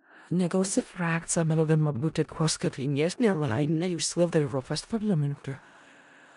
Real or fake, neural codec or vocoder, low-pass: fake; codec, 16 kHz in and 24 kHz out, 0.4 kbps, LongCat-Audio-Codec, four codebook decoder; 10.8 kHz